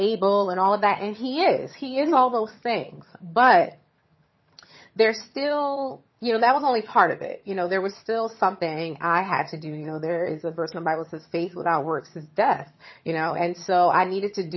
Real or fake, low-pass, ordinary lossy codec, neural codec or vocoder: fake; 7.2 kHz; MP3, 24 kbps; vocoder, 22.05 kHz, 80 mel bands, HiFi-GAN